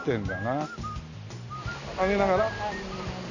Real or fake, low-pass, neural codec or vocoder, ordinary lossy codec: real; 7.2 kHz; none; none